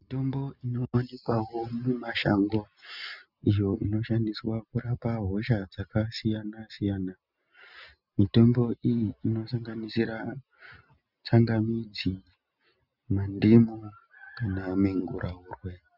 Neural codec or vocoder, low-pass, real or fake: none; 5.4 kHz; real